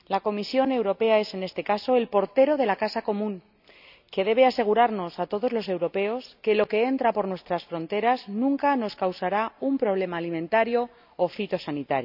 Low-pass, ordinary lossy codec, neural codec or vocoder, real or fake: 5.4 kHz; none; none; real